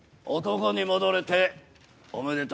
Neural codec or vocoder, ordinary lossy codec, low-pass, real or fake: none; none; none; real